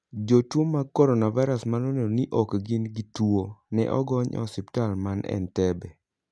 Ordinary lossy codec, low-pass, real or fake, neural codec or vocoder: none; none; real; none